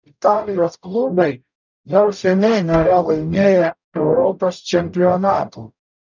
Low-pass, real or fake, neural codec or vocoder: 7.2 kHz; fake; codec, 44.1 kHz, 0.9 kbps, DAC